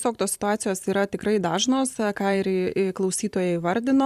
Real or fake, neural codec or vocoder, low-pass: fake; vocoder, 44.1 kHz, 128 mel bands every 512 samples, BigVGAN v2; 14.4 kHz